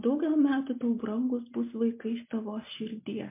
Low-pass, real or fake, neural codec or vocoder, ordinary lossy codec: 3.6 kHz; real; none; MP3, 24 kbps